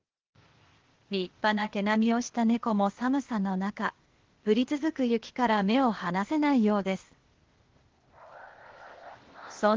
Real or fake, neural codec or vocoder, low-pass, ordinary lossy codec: fake; codec, 16 kHz, 0.8 kbps, ZipCodec; 7.2 kHz; Opus, 16 kbps